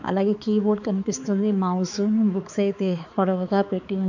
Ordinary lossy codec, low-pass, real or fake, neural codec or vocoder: none; 7.2 kHz; fake; codec, 16 kHz, 4 kbps, X-Codec, HuBERT features, trained on balanced general audio